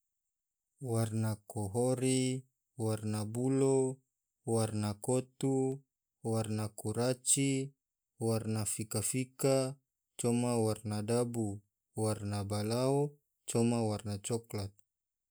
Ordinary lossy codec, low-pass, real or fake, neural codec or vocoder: none; none; real; none